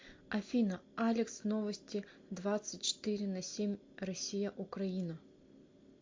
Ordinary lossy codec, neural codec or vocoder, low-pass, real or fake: MP3, 48 kbps; none; 7.2 kHz; real